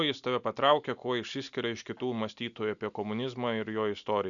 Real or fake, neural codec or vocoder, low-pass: real; none; 7.2 kHz